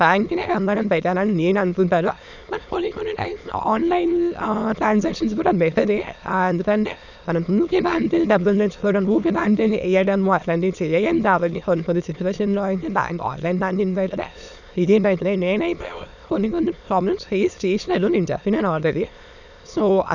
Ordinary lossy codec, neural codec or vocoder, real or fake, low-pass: none; autoencoder, 22.05 kHz, a latent of 192 numbers a frame, VITS, trained on many speakers; fake; 7.2 kHz